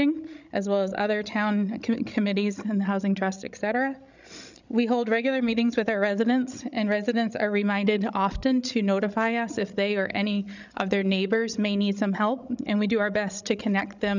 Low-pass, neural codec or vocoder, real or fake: 7.2 kHz; codec, 16 kHz, 16 kbps, FreqCodec, larger model; fake